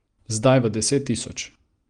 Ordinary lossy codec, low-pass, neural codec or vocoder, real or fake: Opus, 24 kbps; 9.9 kHz; none; real